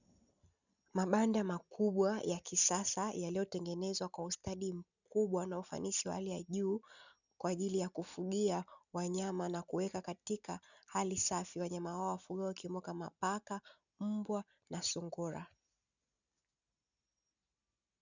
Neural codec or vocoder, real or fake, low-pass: none; real; 7.2 kHz